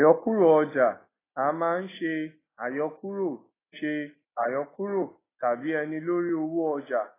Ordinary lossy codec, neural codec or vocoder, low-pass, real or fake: AAC, 16 kbps; none; 3.6 kHz; real